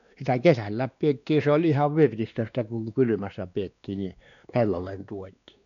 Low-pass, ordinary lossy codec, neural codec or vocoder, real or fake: 7.2 kHz; none; codec, 16 kHz, 2 kbps, X-Codec, WavLM features, trained on Multilingual LibriSpeech; fake